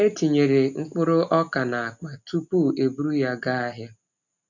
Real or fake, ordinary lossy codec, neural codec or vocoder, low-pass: real; none; none; 7.2 kHz